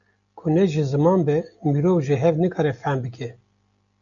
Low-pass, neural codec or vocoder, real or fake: 7.2 kHz; none; real